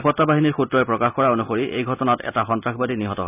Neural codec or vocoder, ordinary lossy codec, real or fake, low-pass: none; none; real; 3.6 kHz